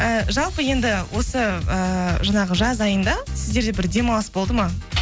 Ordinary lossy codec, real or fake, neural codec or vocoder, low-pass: none; real; none; none